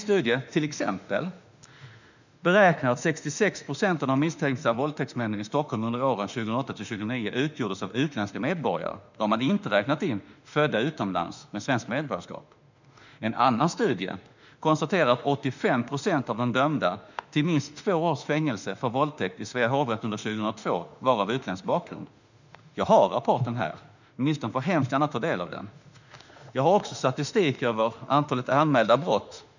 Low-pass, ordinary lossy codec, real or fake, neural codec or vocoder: 7.2 kHz; none; fake; autoencoder, 48 kHz, 32 numbers a frame, DAC-VAE, trained on Japanese speech